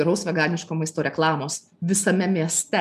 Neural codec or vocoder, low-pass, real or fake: none; 14.4 kHz; real